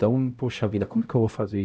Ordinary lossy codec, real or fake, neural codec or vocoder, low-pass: none; fake; codec, 16 kHz, 0.5 kbps, X-Codec, HuBERT features, trained on LibriSpeech; none